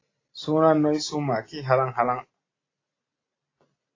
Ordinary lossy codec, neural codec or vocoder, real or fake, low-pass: AAC, 32 kbps; none; real; 7.2 kHz